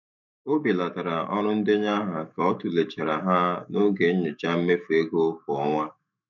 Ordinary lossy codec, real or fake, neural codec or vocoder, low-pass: none; fake; autoencoder, 48 kHz, 128 numbers a frame, DAC-VAE, trained on Japanese speech; 7.2 kHz